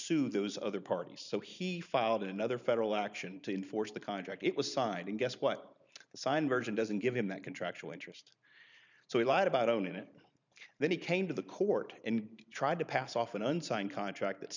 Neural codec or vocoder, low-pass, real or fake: vocoder, 44.1 kHz, 128 mel bands every 512 samples, BigVGAN v2; 7.2 kHz; fake